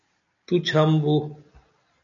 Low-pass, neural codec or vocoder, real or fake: 7.2 kHz; none; real